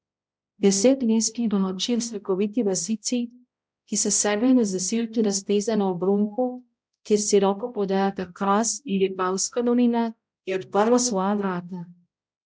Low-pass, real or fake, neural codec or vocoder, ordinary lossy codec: none; fake; codec, 16 kHz, 0.5 kbps, X-Codec, HuBERT features, trained on balanced general audio; none